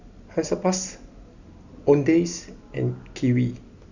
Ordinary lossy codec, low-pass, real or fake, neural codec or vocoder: none; 7.2 kHz; real; none